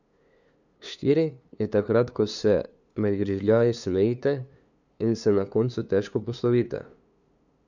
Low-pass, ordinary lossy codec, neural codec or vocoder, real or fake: 7.2 kHz; none; codec, 16 kHz, 2 kbps, FunCodec, trained on LibriTTS, 25 frames a second; fake